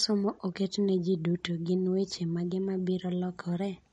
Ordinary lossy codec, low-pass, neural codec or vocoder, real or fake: MP3, 48 kbps; 19.8 kHz; none; real